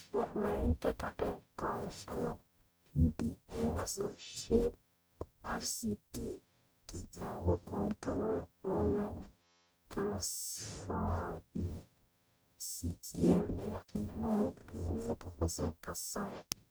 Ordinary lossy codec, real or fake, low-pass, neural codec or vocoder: none; fake; none; codec, 44.1 kHz, 0.9 kbps, DAC